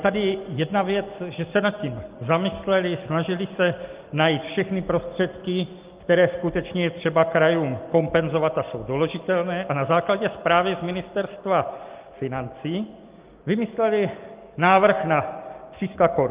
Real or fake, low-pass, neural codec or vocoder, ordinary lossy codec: real; 3.6 kHz; none; Opus, 32 kbps